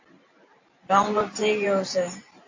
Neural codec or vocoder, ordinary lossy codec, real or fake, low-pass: vocoder, 44.1 kHz, 128 mel bands every 512 samples, BigVGAN v2; AAC, 48 kbps; fake; 7.2 kHz